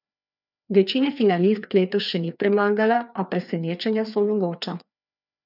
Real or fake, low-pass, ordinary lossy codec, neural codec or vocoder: fake; 5.4 kHz; none; codec, 16 kHz, 2 kbps, FreqCodec, larger model